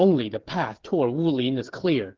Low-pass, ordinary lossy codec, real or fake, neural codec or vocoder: 7.2 kHz; Opus, 16 kbps; fake; codec, 16 kHz, 8 kbps, FreqCodec, smaller model